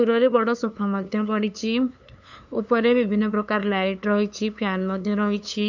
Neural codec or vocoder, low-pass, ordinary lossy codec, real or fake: codec, 16 kHz, 2 kbps, FunCodec, trained on LibriTTS, 25 frames a second; 7.2 kHz; none; fake